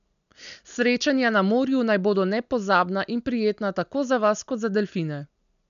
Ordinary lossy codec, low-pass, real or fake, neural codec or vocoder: none; 7.2 kHz; real; none